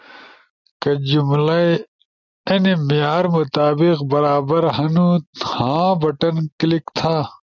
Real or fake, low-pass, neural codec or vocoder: real; 7.2 kHz; none